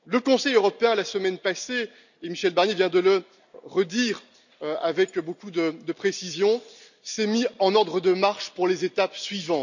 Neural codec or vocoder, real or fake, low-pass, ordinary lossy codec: none; real; 7.2 kHz; none